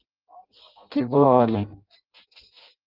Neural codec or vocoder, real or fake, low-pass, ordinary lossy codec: codec, 16 kHz in and 24 kHz out, 0.6 kbps, FireRedTTS-2 codec; fake; 5.4 kHz; Opus, 32 kbps